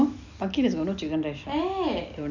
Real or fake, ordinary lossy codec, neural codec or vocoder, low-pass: real; none; none; 7.2 kHz